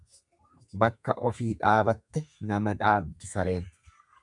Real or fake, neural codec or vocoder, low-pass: fake; codec, 32 kHz, 1.9 kbps, SNAC; 10.8 kHz